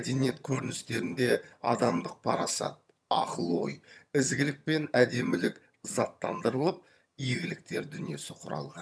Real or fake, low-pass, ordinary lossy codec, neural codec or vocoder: fake; none; none; vocoder, 22.05 kHz, 80 mel bands, HiFi-GAN